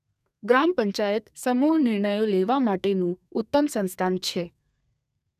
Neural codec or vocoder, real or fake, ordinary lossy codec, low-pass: codec, 32 kHz, 1.9 kbps, SNAC; fake; none; 14.4 kHz